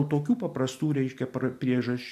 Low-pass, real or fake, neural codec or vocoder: 14.4 kHz; real; none